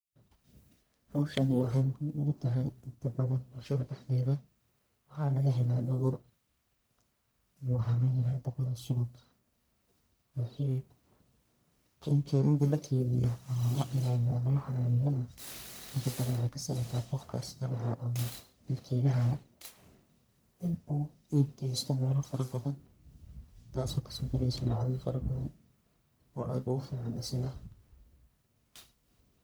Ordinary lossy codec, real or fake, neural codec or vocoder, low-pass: none; fake; codec, 44.1 kHz, 1.7 kbps, Pupu-Codec; none